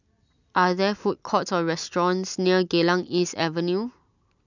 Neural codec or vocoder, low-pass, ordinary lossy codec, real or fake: none; 7.2 kHz; none; real